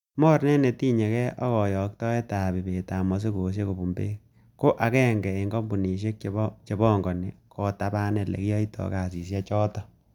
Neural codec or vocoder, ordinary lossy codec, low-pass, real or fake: none; none; 19.8 kHz; real